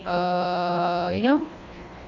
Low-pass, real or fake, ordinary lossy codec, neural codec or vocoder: 7.2 kHz; fake; AAC, 48 kbps; codec, 24 kHz, 1.5 kbps, HILCodec